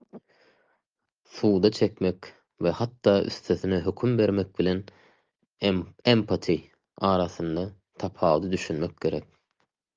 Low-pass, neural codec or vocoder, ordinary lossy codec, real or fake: 7.2 kHz; none; Opus, 32 kbps; real